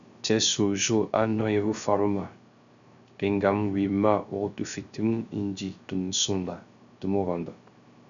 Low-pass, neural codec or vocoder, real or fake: 7.2 kHz; codec, 16 kHz, 0.3 kbps, FocalCodec; fake